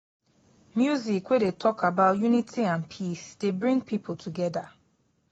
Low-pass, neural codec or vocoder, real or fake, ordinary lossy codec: 19.8 kHz; none; real; AAC, 24 kbps